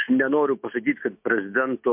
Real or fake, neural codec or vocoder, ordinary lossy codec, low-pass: fake; autoencoder, 48 kHz, 128 numbers a frame, DAC-VAE, trained on Japanese speech; MP3, 32 kbps; 3.6 kHz